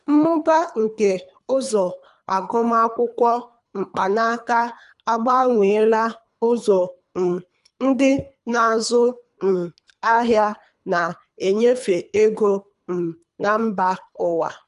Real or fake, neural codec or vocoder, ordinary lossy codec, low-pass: fake; codec, 24 kHz, 3 kbps, HILCodec; none; 10.8 kHz